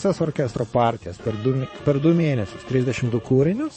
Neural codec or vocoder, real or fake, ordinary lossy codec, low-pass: vocoder, 22.05 kHz, 80 mel bands, Vocos; fake; MP3, 32 kbps; 9.9 kHz